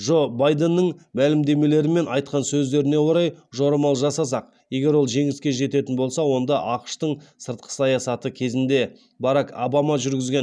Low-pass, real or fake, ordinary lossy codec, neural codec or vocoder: none; real; none; none